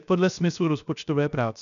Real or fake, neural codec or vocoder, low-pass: fake; codec, 16 kHz, 0.3 kbps, FocalCodec; 7.2 kHz